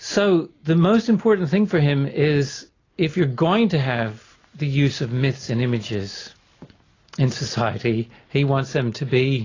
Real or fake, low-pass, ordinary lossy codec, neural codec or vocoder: real; 7.2 kHz; AAC, 32 kbps; none